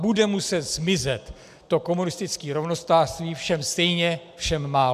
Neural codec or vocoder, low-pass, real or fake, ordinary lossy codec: none; 14.4 kHz; real; MP3, 96 kbps